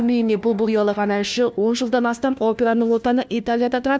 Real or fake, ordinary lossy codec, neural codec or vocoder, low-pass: fake; none; codec, 16 kHz, 1 kbps, FunCodec, trained on LibriTTS, 50 frames a second; none